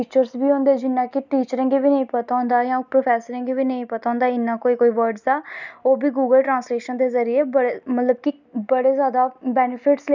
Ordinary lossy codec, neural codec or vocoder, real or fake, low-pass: none; none; real; 7.2 kHz